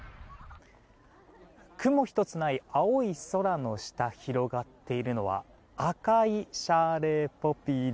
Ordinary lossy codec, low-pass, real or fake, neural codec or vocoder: none; none; real; none